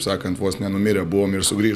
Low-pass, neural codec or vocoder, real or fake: 14.4 kHz; none; real